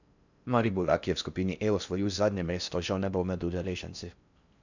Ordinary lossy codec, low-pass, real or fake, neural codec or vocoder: none; 7.2 kHz; fake; codec, 16 kHz in and 24 kHz out, 0.6 kbps, FocalCodec, streaming, 4096 codes